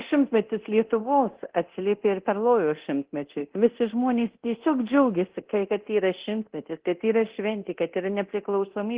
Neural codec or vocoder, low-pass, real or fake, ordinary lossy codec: codec, 24 kHz, 0.9 kbps, DualCodec; 3.6 kHz; fake; Opus, 16 kbps